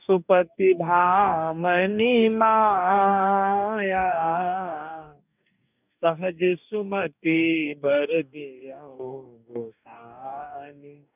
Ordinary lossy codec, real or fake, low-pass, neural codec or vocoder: none; fake; 3.6 kHz; codec, 44.1 kHz, 2.6 kbps, DAC